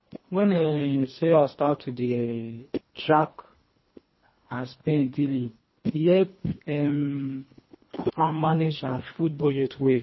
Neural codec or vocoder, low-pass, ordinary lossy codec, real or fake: codec, 24 kHz, 1.5 kbps, HILCodec; 7.2 kHz; MP3, 24 kbps; fake